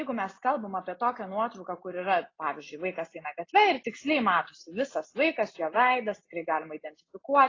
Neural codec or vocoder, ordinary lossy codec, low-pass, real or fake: none; AAC, 32 kbps; 7.2 kHz; real